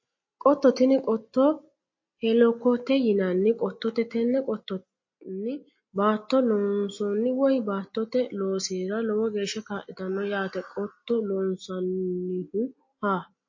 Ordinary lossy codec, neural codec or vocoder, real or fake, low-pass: MP3, 32 kbps; none; real; 7.2 kHz